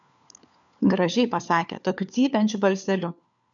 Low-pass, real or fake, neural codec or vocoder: 7.2 kHz; fake; codec, 16 kHz, 4 kbps, FunCodec, trained on LibriTTS, 50 frames a second